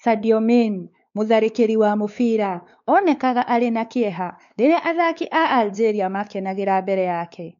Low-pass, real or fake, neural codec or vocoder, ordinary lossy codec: 7.2 kHz; fake; codec, 16 kHz, 4 kbps, X-Codec, WavLM features, trained on Multilingual LibriSpeech; none